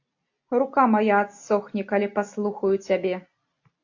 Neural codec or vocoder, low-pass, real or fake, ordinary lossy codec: none; 7.2 kHz; real; AAC, 48 kbps